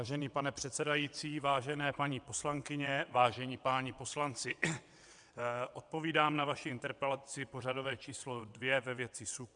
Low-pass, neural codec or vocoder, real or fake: 9.9 kHz; vocoder, 22.05 kHz, 80 mel bands, WaveNeXt; fake